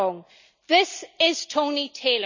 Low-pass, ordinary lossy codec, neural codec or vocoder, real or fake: 7.2 kHz; none; none; real